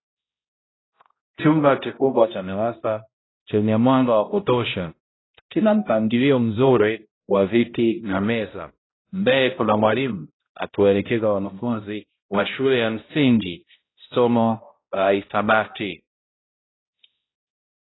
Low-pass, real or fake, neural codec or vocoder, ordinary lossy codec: 7.2 kHz; fake; codec, 16 kHz, 0.5 kbps, X-Codec, HuBERT features, trained on balanced general audio; AAC, 16 kbps